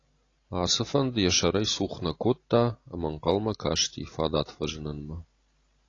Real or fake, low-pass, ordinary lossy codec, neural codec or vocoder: real; 7.2 kHz; AAC, 32 kbps; none